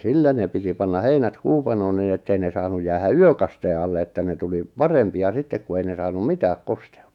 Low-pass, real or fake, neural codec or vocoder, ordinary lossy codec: 19.8 kHz; fake; autoencoder, 48 kHz, 128 numbers a frame, DAC-VAE, trained on Japanese speech; none